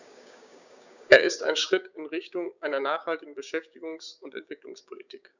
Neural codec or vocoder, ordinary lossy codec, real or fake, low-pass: codec, 16 kHz, 6 kbps, DAC; none; fake; 7.2 kHz